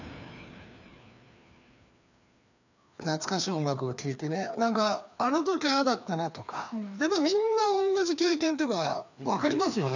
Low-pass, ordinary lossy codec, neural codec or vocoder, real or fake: 7.2 kHz; none; codec, 16 kHz, 2 kbps, FreqCodec, larger model; fake